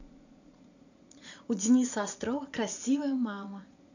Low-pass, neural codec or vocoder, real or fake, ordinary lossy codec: 7.2 kHz; vocoder, 44.1 kHz, 80 mel bands, Vocos; fake; none